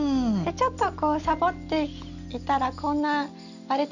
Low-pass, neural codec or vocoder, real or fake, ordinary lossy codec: 7.2 kHz; none; real; none